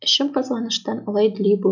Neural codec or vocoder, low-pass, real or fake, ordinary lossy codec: none; 7.2 kHz; real; none